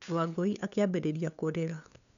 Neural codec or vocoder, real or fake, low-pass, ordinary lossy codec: codec, 16 kHz, 2 kbps, FunCodec, trained on LibriTTS, 25 frames a second; fake; 7.2 kHz; none